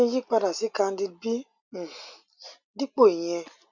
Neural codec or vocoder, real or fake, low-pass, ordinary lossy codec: none; real; 7.2 kHz; none